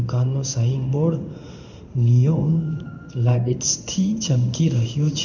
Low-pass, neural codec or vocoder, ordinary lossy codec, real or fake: 7.2 kHz; codec, 16 kHz in and 24 kHz out, 1 kbps, XY-Tokenizer; none; fake